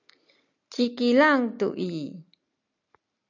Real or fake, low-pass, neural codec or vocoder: real; 7.2 kHz; none